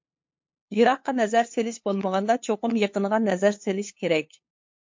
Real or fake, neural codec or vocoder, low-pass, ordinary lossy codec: fake; codec, 16 kHz, 2 kbps, FunCodec, trained on LibriTTS, 25 frames a second; 7.2 kHz; MP3, 48 kbps